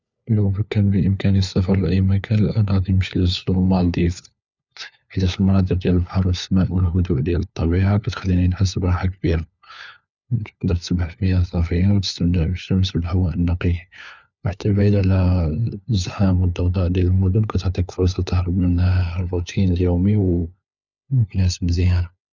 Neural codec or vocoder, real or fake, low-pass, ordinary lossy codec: codec, 16 kHz, 4 kbps, FunCodec, trained on LibriTTS, 50 frames a second; fake; 7.2 kHz; none